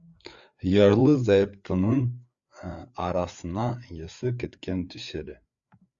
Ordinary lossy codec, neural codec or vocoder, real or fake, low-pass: Opus, 64 kbps; codec, 16 kHz, 4 kbps, FreqCodec, larger model; fake; 7.2 kHz